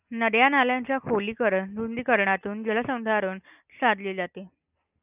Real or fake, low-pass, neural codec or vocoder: real; 3.6 kHz; none